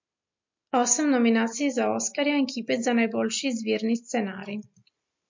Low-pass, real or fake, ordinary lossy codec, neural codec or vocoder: 7.2 kHz; real; MP3, 48 kbps; none